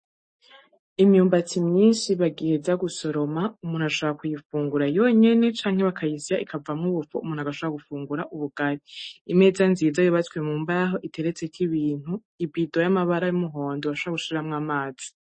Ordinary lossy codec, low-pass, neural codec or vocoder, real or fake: MP3, 32 kbps; 9.9 kHz; none; real